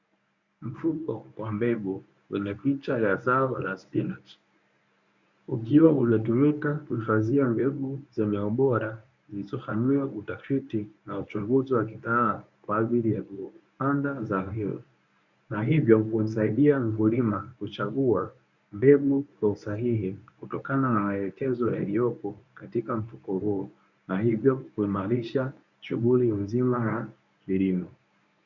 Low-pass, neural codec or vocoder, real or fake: 7.2 kHz; codec, 24 kHz, 0.9 kbps, WavTokenizer, medium speech release version 1; fake